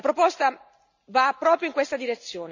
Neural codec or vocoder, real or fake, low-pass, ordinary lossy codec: none; real; 7.2 kHz; none